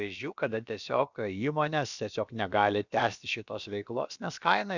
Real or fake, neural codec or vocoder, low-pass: fake; codec, 16 kHz, about 1 kbps, DyCAST, with the encoder's durations; 7.2 kHz